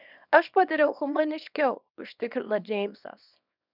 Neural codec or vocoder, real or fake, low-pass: codec, 24 kHz, 0.9 kbps, WavTokenizer, small release; fake; 5.4 kHz